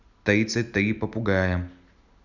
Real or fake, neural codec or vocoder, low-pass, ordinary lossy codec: real; none; 7.2 kHz; none